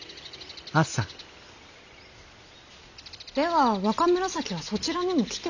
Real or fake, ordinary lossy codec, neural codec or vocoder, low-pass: real; none; none; 7.2 kHz